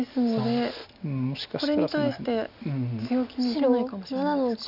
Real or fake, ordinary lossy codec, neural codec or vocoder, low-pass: real; none; none; 5.4 kHz